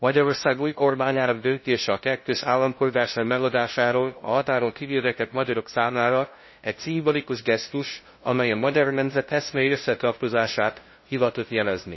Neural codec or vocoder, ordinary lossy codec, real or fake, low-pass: codec, 16 kHz, 0.5 kbps, FunCodec, trained on LibriTTS, 25 frames a second; MP3, 24 kbps; fake; 7.2 kHz